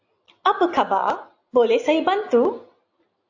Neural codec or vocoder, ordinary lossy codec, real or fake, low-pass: none; AAC, 48 kbps; real; 7.2 kHz